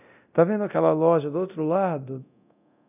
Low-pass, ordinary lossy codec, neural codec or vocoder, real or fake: 3.6 kHz; none; codec, 24 kHz, 0.9 kbps, DualCodec; fake